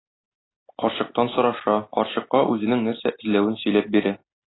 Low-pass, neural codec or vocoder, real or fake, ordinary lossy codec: 7.2 kHz; none; real; AAC, 16 kbps